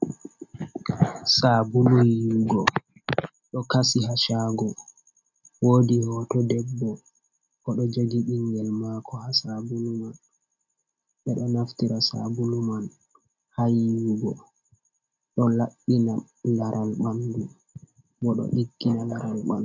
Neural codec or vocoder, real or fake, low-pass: none; real; 7.2 kHz